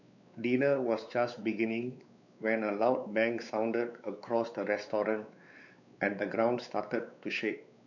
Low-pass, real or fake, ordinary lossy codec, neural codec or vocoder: 7.2 kHz; fake; none; codec, 16 kHz, 4 kbps, X-Codec, WavLM features, trained on Multilingual LibriSpeech